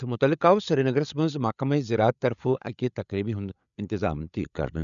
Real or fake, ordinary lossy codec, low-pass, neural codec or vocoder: fake; none; 7.2 kHz; codec, 16 kHz, 8 kbps, FreqCodec, larger model